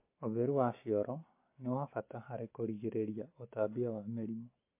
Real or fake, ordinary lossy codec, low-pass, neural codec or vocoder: real; MP3, 24 kbps; 3.6 kHz; none